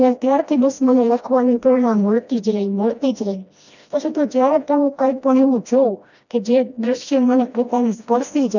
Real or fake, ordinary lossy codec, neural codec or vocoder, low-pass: fake; none; codec, 16 kHz, 1 kbps, FreqCodec, smaller model; 7.2 kHz